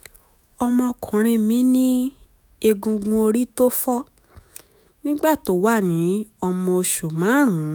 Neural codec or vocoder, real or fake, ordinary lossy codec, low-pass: autoencoder, 48 kHz, 128 numbers a frame, DAC-VAE, trained on Japanese speech; fake; none; none